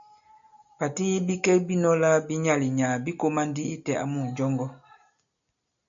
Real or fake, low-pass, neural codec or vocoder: real; 7.2 kHz; none